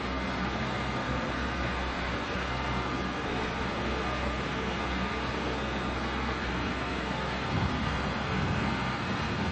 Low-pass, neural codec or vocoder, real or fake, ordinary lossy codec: 9.9 kHz; codec, 32 kHz, 1.9 kbps, SNAC; fake; MP3, 32 kbps